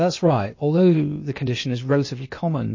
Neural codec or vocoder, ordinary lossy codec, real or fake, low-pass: codec, 16 kHz, 0.8 kbps, ZipCodec; MP3, 32 kbps; fake; 7.2 kHz